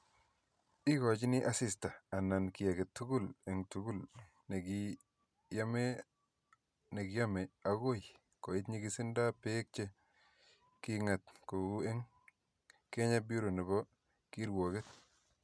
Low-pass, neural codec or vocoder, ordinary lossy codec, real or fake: none; none; none; real